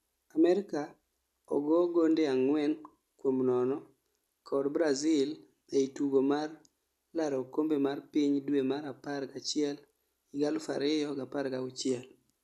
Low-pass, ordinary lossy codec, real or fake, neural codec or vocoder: 14.4 kHz; none; real; none